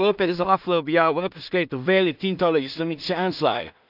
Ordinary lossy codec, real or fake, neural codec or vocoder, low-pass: none; fake; codec, 16 kHz in and 24 kHz out, 0.4 kbps, LongCat-Audio-Codec, two codebook decoder; 5.4 kHz